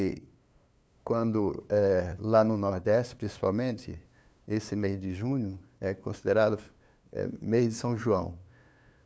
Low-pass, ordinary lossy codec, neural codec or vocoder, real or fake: none; none; codec, 16 kHz, 2 kbps, FunCodec, trained on LibriTTS, 25 frames a second; fake